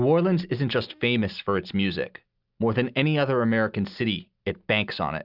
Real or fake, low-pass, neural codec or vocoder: real; 5.4 kHz; none